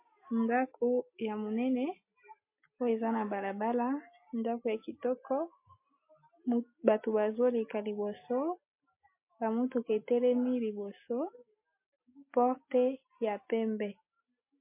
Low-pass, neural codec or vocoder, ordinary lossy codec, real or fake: 3.6 kHz; none; MP3, 24 kbps; real